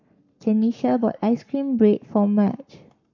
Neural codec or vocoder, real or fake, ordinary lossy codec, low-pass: codec, 44.1 kHz, 7.8 kbps, Pupu-Codec; fake; none; 7.2 kHz